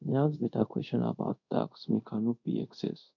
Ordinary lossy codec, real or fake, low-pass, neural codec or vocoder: none; fake; 7.2 kHz; codec, 24 kHz, 0.5 kbps, DualCodec